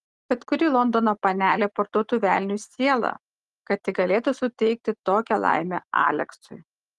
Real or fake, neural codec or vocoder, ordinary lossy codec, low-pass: real; none; Opus, 24 kbps; 10.8 kHz